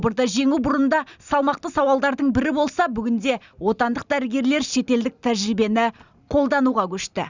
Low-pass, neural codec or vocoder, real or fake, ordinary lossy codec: 7.2 kHz; none; real; Opus, 64 kbps